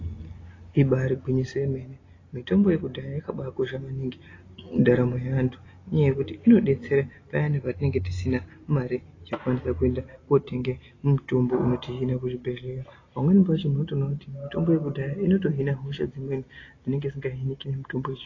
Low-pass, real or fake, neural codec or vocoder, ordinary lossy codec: 7.2 kHz; real; none; AAC, 32 kbps